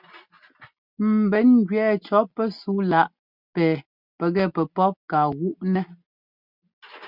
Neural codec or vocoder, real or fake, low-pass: none; real; 5.4 kHz